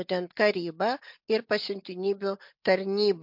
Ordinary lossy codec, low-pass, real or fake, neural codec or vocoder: MP3, 48 kbps; 5.4 kHz; real; none